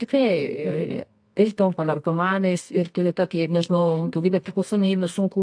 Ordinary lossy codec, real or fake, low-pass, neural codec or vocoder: AAC, 64 kbps; fake; 9.9 kHz; codec, 24 kHz, 0.9 kbps, WavTokenizer, medium music audio release